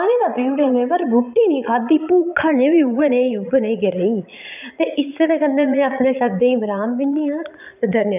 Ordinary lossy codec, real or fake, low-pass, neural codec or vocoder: none; fake; 3.6 kHz; vocoder, 22.05 kHz, 80 mel bands, Vocos